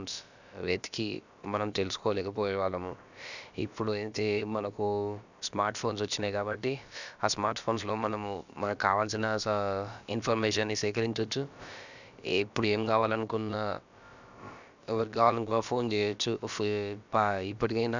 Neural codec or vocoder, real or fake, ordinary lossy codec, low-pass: codec, 16 kHz, about 1 kbps, DyCAST, with the encoder's durations; fake; none; 7.2 kHz